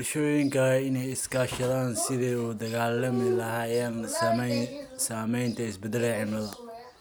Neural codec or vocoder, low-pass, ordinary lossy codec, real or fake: none; none; none; real